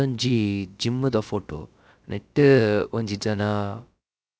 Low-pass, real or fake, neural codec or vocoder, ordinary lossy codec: none; fake; codec, 16 kHz, about 1 kbps, DyCAST, with the encoder's durations; none